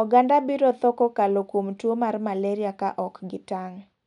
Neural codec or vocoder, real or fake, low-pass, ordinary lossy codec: none; real; 10.8 kHz; none